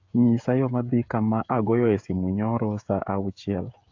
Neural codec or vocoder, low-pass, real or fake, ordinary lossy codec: codec, 16 kHz, 16 kbps, FreqCodec, smaller model; 7.2 kHz; fake; AAC, 48 kbps